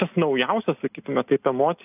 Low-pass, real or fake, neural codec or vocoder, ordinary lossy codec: 3.6 kHz; real; none; AAC, 32 kbps